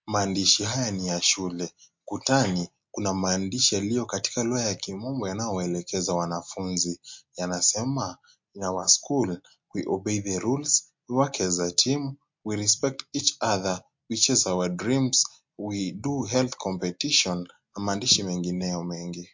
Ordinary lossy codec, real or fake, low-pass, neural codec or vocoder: MP3, 48 kbps; real; 7.2 kHz; none